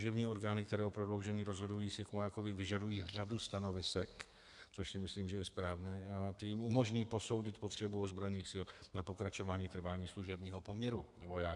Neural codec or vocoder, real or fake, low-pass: codec, 32 kHz, 1.9 kbps, SNAC; fake; 10.8 kHz